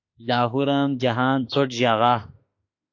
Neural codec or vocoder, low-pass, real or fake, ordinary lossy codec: autoencoder, 48 kHz, 32 numbers a frame, DAC-VAE, trained on Japanese speech; 7.2 kHz; fake; AAC, 48 kbps